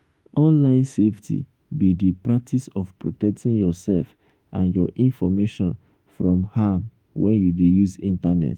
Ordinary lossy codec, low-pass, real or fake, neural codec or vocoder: Opus, 32 kbps; 19.8 kHz; fake; autoencoder, 48 kHz, 32 numbers a frame, DAC-VAE, trained on Japanese speech